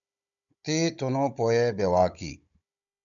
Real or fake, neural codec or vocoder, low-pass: fake; codec, 16 kHz, 16 kbps, FunCodec, trained on Chinese and English, 50 frames a second; 7.2 kHz